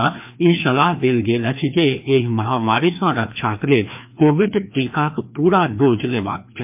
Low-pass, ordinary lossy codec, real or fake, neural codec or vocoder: 3.6 kHz; MP3, 32 kbps; fake; codec, 16 kHz, 2 kbps, FreqCodec, larger model